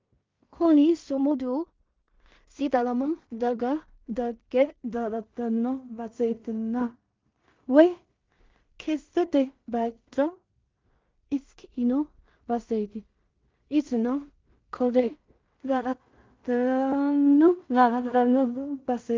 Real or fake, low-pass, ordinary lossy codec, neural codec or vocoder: fake; 7.2 kHz; Opus, 24 kbps; codec, 16 kHz in and 24 kHz out, 0.4 kbps, LongCat-Audio-Codec, two codebook decoder